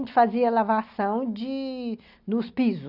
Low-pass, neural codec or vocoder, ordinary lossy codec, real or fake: 5.4 kHz; none; none; real